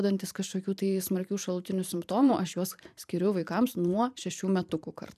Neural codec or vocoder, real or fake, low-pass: vocoder, 44.1 kHz, 128 mel bands every 512 samples, BigVGAN v2; fake; 14.4 kHz